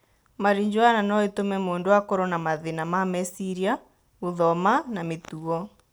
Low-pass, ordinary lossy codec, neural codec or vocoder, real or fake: none; none; none; real